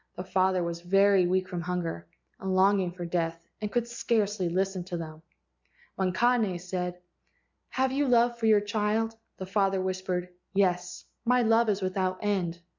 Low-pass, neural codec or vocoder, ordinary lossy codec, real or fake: 7.2 kHz; none; MP3, 64 kbps; real